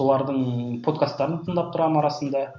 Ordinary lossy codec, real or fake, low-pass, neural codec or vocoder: MP3, 48 kbps; real; 7.2 kHz; none